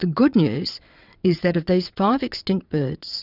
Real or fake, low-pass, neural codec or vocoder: fake; 5.4 kHz; vocoder, 22.05 kHz, 80 mel bands, WaveNeXt